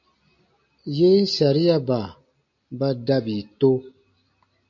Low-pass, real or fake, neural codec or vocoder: 7.2 kHz; real; none